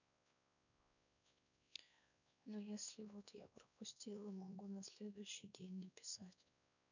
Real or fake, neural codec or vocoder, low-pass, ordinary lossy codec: fake; codec, 16 kHz, 2 kbps, X-Codec, WavLM features, trained on Multilingual LibriSpeech; 7.2 kHz; none